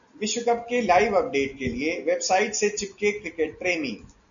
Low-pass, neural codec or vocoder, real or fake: 7.2 kHz; none; real